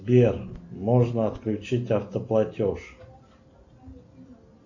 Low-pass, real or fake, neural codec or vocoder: 7.2 kHz; real; none